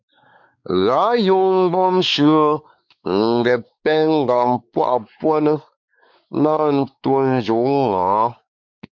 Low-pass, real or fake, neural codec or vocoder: 7.2 kHz; fake; codec, 16 kHz, 4 kbps, X-Codec, WavLM features, trained on Multilingual LibriSpeech